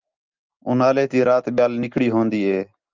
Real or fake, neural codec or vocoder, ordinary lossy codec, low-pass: real; none; Opus, 32 kbps; 7.2 kHz